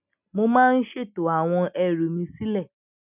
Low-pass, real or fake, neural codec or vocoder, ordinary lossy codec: 3.6 kHz; real; none; none